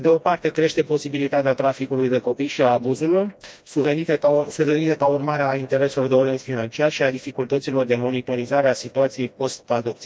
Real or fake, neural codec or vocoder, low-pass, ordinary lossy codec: fake; codec, 16 kHz, 1 kbps, FreqCodec, smaller model; none; none